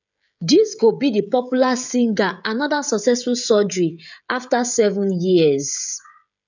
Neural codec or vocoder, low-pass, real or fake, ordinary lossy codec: codec, 16 kHz, 16 kbps, FreqCodec, smaller model; 7.2 kHz; fake; none